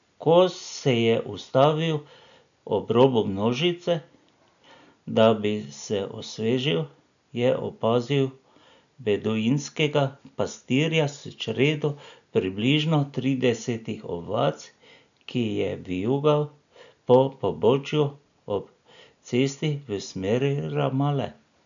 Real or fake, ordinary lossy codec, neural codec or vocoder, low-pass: real; none; none; 7.2 kHz